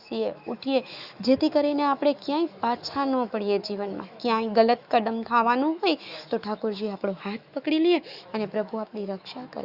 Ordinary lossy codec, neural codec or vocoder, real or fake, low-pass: Opus, 64 kbps; autoencoder, 48 kHz, 128 numbers a frame, DAC-VAE, trained on Japanese speech; fake; 5.4 kHz